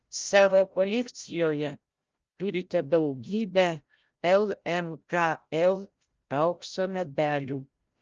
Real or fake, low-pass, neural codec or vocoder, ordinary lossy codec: fake; 7.2 kHz; codec, 16 kHz, 0.5 kbps, FreqCodec, larger model; Opus, 24 kbps